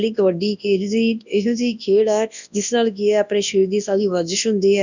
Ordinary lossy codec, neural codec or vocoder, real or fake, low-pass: none; codec, 24 kHz, 0.9 kbps, WavTokenizer, large speech release; fake; 7.2 kHz